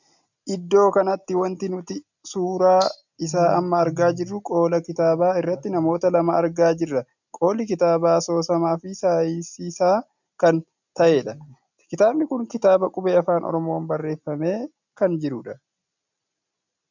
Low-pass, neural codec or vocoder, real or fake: 7.2 kHz; none; real